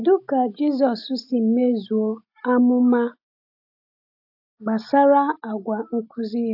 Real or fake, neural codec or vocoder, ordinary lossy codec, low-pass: real; none; AAC, 48 kbps; 5.4 kHz